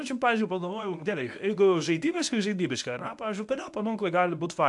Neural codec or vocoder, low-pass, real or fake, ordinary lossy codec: codec, 24 kHz, 0.9 kbps, WavTokenizer, medium speech release version 1; 10.8 kHz; fake; AAC, 64 kbps